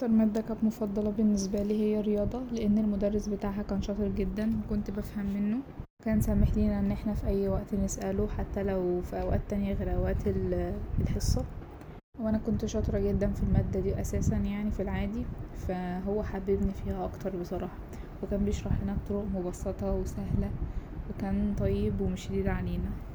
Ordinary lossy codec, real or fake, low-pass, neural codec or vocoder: none; real; none; none